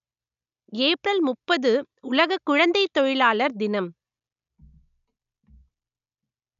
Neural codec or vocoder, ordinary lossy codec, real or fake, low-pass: none; none; real; 7.2 kHz